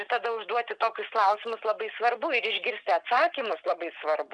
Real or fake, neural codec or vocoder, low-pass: real; none; 9.9 kHz